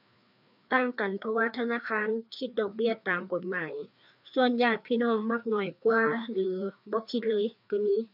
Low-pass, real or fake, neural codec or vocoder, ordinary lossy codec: 5.4 kHz; fake; codec, 16 kHz, 2 kbps, FreqCodec, larger model; none